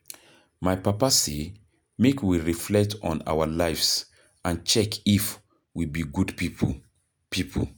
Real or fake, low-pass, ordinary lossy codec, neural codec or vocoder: real; none; none; none